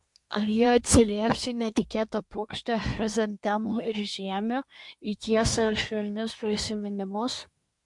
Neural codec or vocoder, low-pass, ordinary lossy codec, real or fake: codec, 24 kHz, 1 kbps, SNAC; 10.8 kHz; MP3, 64 kbps; fake